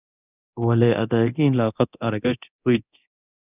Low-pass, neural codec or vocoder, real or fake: 3.6 kHz; codec, 24 kHz, 0.9 kbps, DualCodec; fake